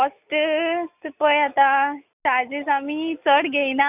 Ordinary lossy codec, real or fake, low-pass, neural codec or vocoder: none; real; 3.6 kHz; none